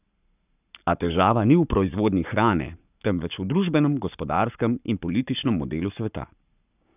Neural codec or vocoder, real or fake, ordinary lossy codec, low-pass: codec, 44.1 kHz, 7.8 kbps, Pupu-Codec; fake; none; 3.6 kHz